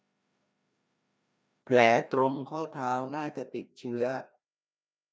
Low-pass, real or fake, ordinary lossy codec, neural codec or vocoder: none; fake; none; codec, 16 kHz, 1 kbps, FreqCodec, larger model